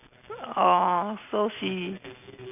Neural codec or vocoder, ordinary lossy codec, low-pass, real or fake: none; none; 3.6 kHz; real